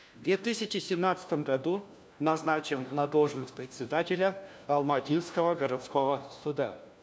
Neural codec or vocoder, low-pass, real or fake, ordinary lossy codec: codec, 16 kHz, 1 kbps, FunCodec, trained on LibriTTS, 50 frames a second; none; fake; none